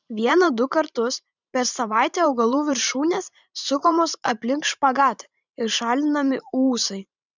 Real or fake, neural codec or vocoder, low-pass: fake; vocoder, 44.1 kHz, 128 mel bands every 512 samples, BigVGAN v2; 7.2 kHz